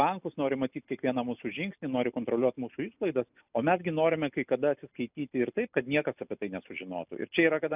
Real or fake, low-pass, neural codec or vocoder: real; 3.6 kHz; none